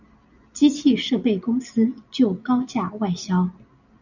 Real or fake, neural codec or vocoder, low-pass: real; none; 7.2 kHz